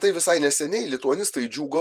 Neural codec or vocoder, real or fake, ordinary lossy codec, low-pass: vocoder, 48 kHz, 128 mel bands, Vocos; fake; Opus, 32 kbps; 14.4 kHz